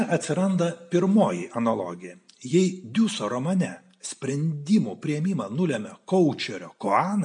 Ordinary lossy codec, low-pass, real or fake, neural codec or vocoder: MP3, 64 kbps; 9.9 kHz; real; none